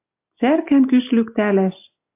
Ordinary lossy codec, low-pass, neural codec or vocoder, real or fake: AAC, 24 kbps; 3.6 kHz; none; real